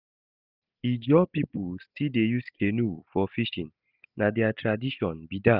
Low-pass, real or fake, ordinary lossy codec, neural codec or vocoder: 5.4 kHz; real; none; none